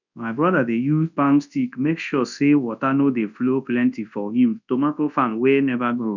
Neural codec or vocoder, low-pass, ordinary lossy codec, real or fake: codec, 24 kHz, 0.9 kbps, WavTokenizer, large speech release; 7.2 kHz; none; fake